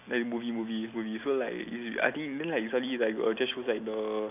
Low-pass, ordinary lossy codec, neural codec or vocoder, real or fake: 3.6 kHz; none; none; real